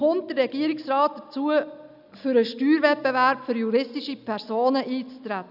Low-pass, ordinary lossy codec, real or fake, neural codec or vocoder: 5.4 kHz; none; real; none